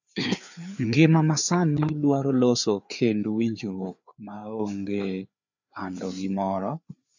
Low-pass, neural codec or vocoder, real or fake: 7.2 kHz; codec, 16 kHz, 4 kbps, FreqCodec, larger model; fake